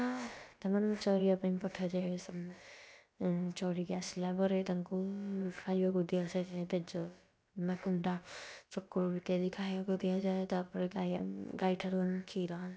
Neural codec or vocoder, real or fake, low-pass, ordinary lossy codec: codec, 16 kHz, about 1 kbps, DyCAST, with the encoder's durations; fake; none; none